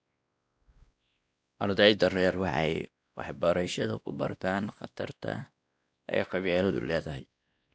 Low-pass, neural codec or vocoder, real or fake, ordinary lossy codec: none; codec, 16 kHz, 1 kbps, X-Codec, WavLM features, trained on Multilingual LibriSpeech; fake; none